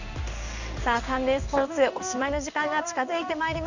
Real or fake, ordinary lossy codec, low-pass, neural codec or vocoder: fake; none; 7.2 kHz; codec, 16 kHz in and 24 kHz out, 1 kbps, XY-Tokenizer